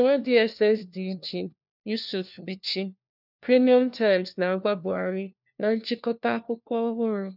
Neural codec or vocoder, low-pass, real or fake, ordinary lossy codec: codec, 16 kHz, 1 kbps, FunCodec, trained on LibriTTS, 50 frames a second; 5.4 kHz; fake; none